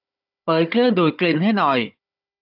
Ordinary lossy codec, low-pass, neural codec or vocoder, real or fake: AAC, 32 kbps; 5.4 kHz; codec, 16 kHz, 16 kbps, FunCodec, trained on Chinese and English, 50 frames a second; fake